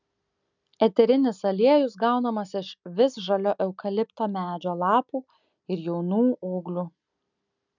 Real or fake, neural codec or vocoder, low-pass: real; none; 7.2 kHz